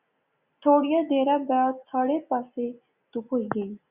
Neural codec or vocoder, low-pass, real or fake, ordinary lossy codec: none; 3.6 kHz; real; Opus, 64 kbps